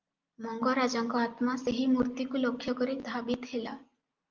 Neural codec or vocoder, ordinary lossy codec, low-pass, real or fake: none; Opus, 32 kbps; 7.2 kHz; real